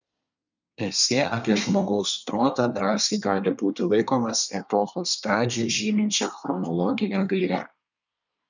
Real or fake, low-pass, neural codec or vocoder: fake; 7.2 kHz; codec, 24 kHz, 1 kbps, SNAC